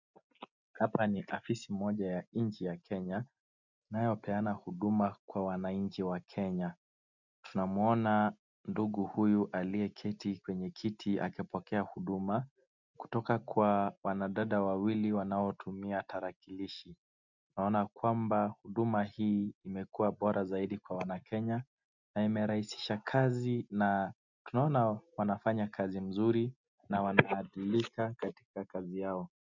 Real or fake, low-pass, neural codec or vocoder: real; 7.2 kHz; none